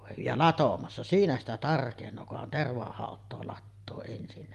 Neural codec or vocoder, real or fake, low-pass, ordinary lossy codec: none; real; 14.4 kHz; Opus, 32 kbps